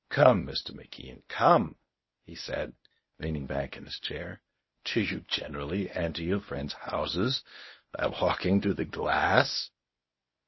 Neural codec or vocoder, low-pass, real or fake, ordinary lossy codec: codec, 24 kHz, 0.9 kbps, WavTokenizer, medium speech release version 1; 7.2 kHz; fake; MP3, 24 kbps